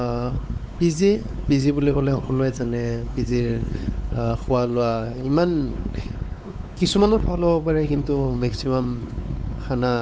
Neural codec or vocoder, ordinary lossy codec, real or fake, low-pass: codec, 16 kHz, 4 kbps, X-Codec, WavLM features, trained on Multilingual LibriSpeech; none; fake; none